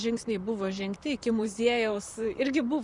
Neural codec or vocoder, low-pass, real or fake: vocoder, 44.1 kHz, 128 mel bands, Pupu-Vocoder; 10.8 kHz; fake